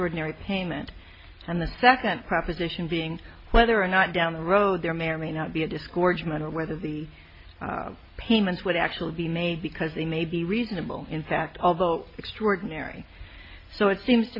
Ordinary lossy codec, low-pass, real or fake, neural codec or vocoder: MP3, 32 kbps; 5.4 kHz; real; none